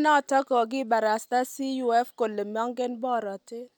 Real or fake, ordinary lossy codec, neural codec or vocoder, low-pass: real; none; none; none